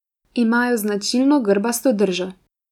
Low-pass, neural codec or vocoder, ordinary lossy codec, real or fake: 19.8 kHz; none; none; real